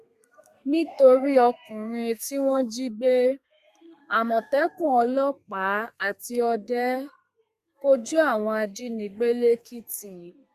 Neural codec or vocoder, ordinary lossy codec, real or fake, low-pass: codec, 32 kHz, 1.9 kbps, SNAC; Opus, 64 kbps; fake; 14.4 kHz